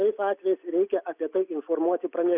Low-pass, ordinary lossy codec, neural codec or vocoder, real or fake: 3.6 kHz; Opus, 64 kbps; none; real